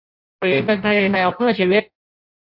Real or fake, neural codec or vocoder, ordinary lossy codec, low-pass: fake; codec, 16 kHz in and 24 kHz out, 0.6 kbps, FireRedTTS-2 codec; none; 5.4 kHz